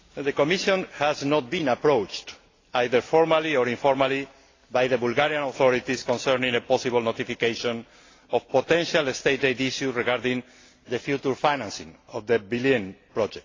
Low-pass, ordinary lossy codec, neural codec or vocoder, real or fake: 7.2 kHz; AAC, 32 kbps; none; real